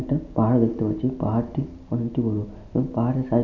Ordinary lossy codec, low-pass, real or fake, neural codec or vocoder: none; 7.2 kHz; real; none